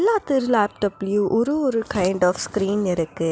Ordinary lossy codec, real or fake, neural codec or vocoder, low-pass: none; real; none; none